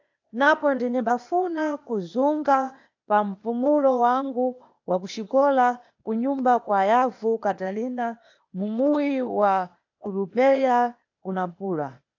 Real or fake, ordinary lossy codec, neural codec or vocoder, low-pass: fake; AAC, 48 kbps; codec, 16 kHz, 0.8 kbps, ZipCodec; 7.2 kHz